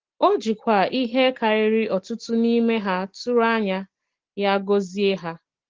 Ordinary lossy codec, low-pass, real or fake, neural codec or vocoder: Opus, 16 kbps; 7.2 kHz; real; none